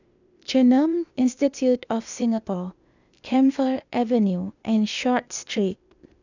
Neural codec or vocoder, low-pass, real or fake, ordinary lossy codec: codec, 16 kHz, 0.8 kbps, ZipCodec; 7.2 kHz; fake; none